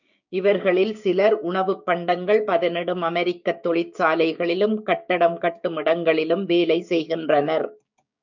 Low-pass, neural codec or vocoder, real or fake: 7.2 kHz; codec, 16 kHz, 6 kbps, DAC; fake